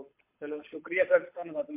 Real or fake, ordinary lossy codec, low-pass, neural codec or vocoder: fake; MP3, 24 kbps; 3.6 kHz; codec, 24 kHz, 6 kbps, HILCodec